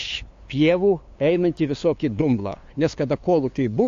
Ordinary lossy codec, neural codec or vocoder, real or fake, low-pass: AAC, 48 kbps; codec, 16 kHz, 2 kbps, FunCodec, trained on LibriTTS, 25 frames a second; fake; 7.2 kHz